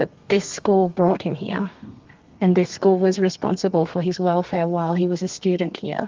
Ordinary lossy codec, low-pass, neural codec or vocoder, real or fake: Opus, 32 kbps; 7.2 kHz; codec, 32 kHz, 1.9 kbps, SNAC; fake